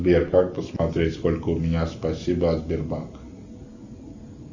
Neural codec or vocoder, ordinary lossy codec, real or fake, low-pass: none; Opus, 64 kbps; real; 7.2 kHz